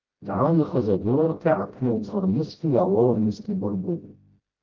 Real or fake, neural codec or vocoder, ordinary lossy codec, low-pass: fake; codec, 16 kHz, 0.5 kbps, FreqCodec, smaller model; Opus, 32 kbps; 7.2 kHz